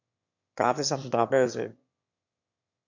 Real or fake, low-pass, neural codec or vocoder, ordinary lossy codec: fake; 7.2 kHz; autoencoder, 22.05 kHz, a latent of 192 numbers a frame, VITS, trained on one speaker; MP3, 64 kbps